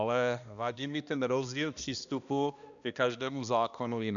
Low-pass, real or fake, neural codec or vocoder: 7.2 kHz; fake; codec, 16 kHz, 1 kbps, X-Codec, HuBERT features, trained on balanced general audio